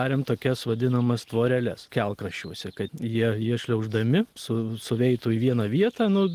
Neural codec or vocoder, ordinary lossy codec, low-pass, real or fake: none; Opus, 24 kbps; 14.4 kHz; real